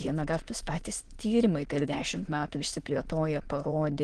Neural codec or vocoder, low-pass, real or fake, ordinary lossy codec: autoencoder, 22.05 kHz, a latent of 192 numbers a frame, VITS, trained on many speakers; 9.9 kHz; fake; Opus, 16 kbps